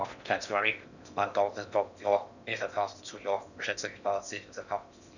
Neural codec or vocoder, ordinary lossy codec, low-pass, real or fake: codec, 16 kHz in and 24 kHz out, 0.6 kbps, FocalCodec, streaming, 4096 codes; none; 7.2 kHz; fake